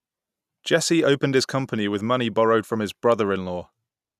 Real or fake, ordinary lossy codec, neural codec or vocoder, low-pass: real; none; none; 14.4 kHz